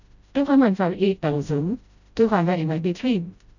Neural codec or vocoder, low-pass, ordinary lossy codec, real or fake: codec, 16 kHz, 0.5 kbps, FreqCodec, smaller model; 7.2 kHz; none; fake